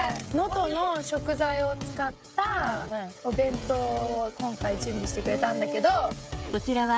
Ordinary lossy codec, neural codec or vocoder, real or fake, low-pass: none; codec, 16 kHz, 16 kbps, FreqCodec, smaller model; fake; none